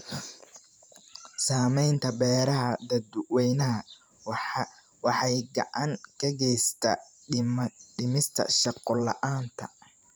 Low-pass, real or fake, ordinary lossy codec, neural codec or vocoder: none; fake; none; vocoder, 44.1 kHz, 128 mel bands every 512 samples, BigVGAN v2